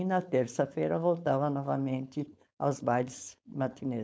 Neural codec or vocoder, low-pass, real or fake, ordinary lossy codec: codec, 16 kHz, 4.8 kbps, FACodec; none; fake; none